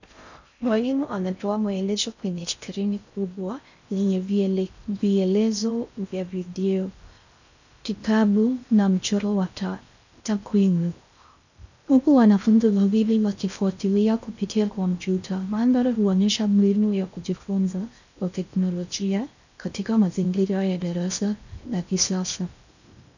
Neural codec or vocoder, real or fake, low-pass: codec, 16 kHz in and 24 kHz out, 0.6 kbps, FocalCodec, streaming, 4096 codes; fake; 7.2 kHz